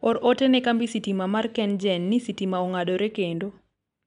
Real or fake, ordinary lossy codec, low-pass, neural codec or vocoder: real; none; 10.8 kHz; none